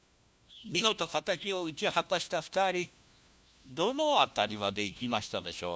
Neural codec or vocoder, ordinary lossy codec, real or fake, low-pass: codec, 16 kHz, 1 kbps, FunCodec, trained on LibriTTS, 50 frames a second; none; fake; none